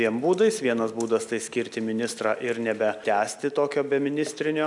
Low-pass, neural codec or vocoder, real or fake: 10.8 kHz; none; real